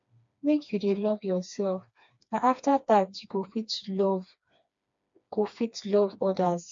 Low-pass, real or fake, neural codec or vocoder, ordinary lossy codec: 7.2 kHz; fake; codec, 16 kHz, 2 kbps, FreqCodec, smaller model; MP3, 48 kbps